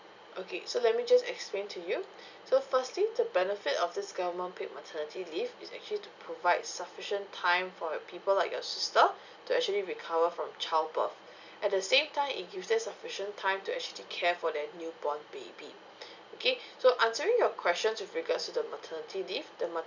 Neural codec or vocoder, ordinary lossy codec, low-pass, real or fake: none; none; 7.2 kHz; real